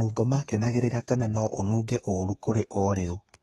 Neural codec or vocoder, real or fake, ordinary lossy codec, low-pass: codec, 32 kHz, 1.9 kbps, SNAC; fake; AAC, 32 kbps; 14.4 kHz